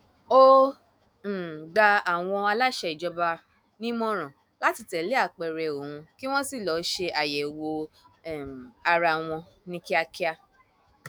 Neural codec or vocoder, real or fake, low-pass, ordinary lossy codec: autoencoder, 48 kHz, 128 numbers a frame, DAC-VAE, trained on Japanese speech; fake; none; none